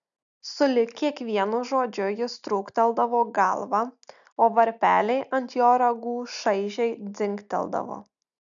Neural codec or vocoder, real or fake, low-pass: none; real; 7.2 kHz